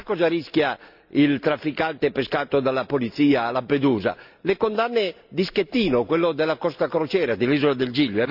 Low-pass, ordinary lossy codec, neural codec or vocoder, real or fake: 5.4 kHz; none; none; real